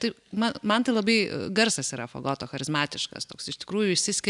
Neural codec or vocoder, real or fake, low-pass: none; real; 10.8 kHz